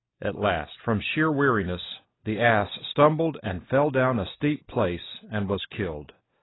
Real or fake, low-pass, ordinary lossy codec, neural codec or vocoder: real; 7.2 kHz; AAC, 16 kbps; none